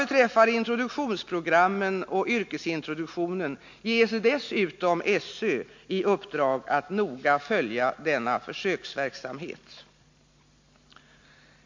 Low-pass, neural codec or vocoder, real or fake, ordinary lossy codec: 7.2 kHz; none; real; MP3, 48 kbps